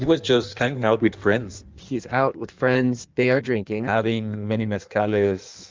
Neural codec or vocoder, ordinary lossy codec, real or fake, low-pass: codec, 16 kHz in and 24 kHz out, 1.1 kbps, FireRedTTS-2 codec; Opus, 32 kbps; fake; 7.2 kHz